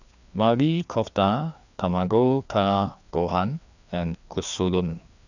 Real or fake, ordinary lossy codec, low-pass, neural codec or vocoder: fake; none; 7.2 kHz; codec, 16 kHz, 2 kbps, FreqCodec, larger model